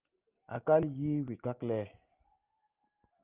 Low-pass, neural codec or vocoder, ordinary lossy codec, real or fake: 3.6 kHz; none; Opus, 24 kbps; real